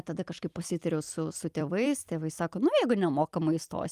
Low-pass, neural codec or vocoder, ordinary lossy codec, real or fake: 14.4 kHz; vocoder, 44.1 kHz, 128 mel bands every 256 samples, BigVGAN v2; Opus, 32 kbps; fake